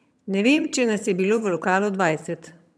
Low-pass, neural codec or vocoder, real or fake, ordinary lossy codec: none; vocoder, 22.05 kHz, 80 mel bands, HiFi-GAN; fake; none